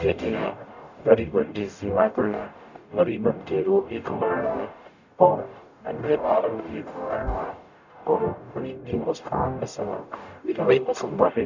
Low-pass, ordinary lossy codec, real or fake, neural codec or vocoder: 7.2 kHz; none; fake; codec, 44.1 kHz, 0.9 kbps, DAC